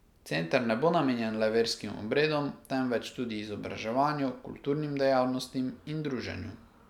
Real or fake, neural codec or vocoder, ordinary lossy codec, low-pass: real; none; none; 19.8 kHz